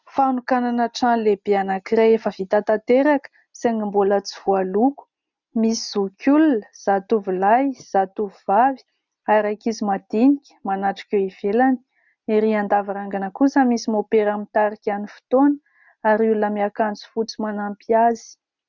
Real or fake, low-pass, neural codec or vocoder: real; 7.2 kHz; none